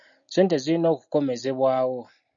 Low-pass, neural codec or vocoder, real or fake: 7.2 kHz; none; real